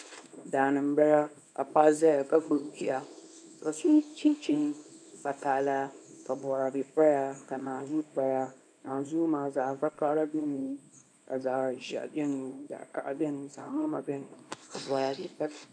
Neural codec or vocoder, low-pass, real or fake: codec, 24 kHz, 0.9 kbps, WavTokenizer, small release; 9.9 kHz; fake